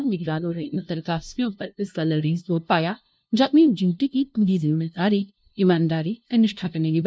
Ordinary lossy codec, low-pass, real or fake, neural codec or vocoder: none; none; fake; codec, 16 kHz, 0.5 kbps, FunCodec, trained on LibriTTS, 25 frames a second